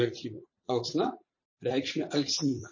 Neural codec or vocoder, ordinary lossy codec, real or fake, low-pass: vocoder, 44.1 kHz, 80 mel bands, Vocos; MP3, 32 kbps; fake; 7.2 kHz